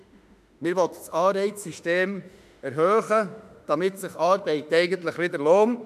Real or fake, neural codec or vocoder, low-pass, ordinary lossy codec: fake; autoencoder, 48 kHz, 32 numbers a frame, DAC-VAE, trained on Japanese speech; 14.4 kHz; none